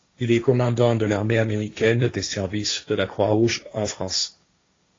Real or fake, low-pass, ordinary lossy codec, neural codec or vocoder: fake; 7.2 kHz; AAC, 32 kbps; codec, 16 kHz, 1.1 kbps, Voila-Tokenizer